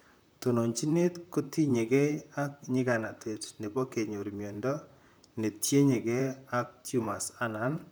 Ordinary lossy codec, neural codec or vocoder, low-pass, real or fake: none; vocoder, 44.1 kHz, 128 mel bands, Pupu-Vocoder; none; fake